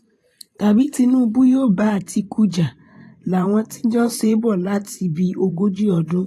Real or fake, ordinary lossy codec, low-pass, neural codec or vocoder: fake; AAC, 48 kbps; 14.4 kHz; vocoder, 44.1 kHz, 128 mel bands every 512 samples, BigVGAN v2